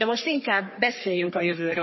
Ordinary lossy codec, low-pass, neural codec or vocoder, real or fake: MP3, 24 kbps; 7.2 kHz; codec, 44.1 kHz, 3.4 kbps, Pupu-Codec; fake